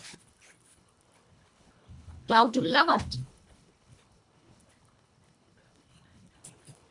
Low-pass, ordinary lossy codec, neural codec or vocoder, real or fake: 10.8 kHz; MP3, 64 kbps; codec, 24 kHz, 1.5 kbps, HILCodec; fake